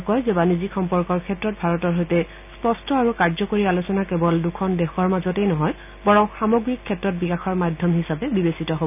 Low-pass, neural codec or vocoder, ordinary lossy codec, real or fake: 3.6 kHz; none; none; real